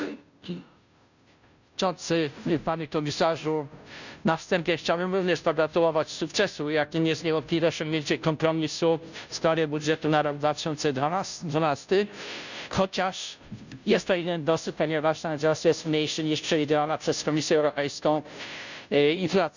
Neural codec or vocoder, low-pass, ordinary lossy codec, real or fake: codec, 16 kHz, 0.5 kbps, FunCodec, trained on Chinese and English, 25 frames a second; 7.2 kHz; none; fake